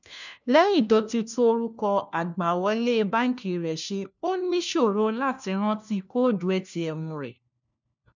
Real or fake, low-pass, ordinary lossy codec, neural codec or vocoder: fake; 7.2 kHz; none; codec, 16 kHz, 1 kbps, FunCodec, trained on LibriTTS, 50 frames a second